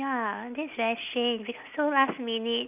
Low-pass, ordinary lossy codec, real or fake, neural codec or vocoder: 3.6 kHz; none; real; none